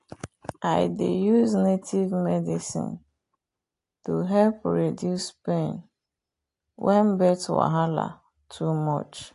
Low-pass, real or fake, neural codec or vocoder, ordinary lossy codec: 10.8 kHz; real; none; AAC, 48 kbps